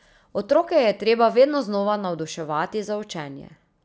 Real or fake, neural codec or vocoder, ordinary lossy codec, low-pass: real; none; none; none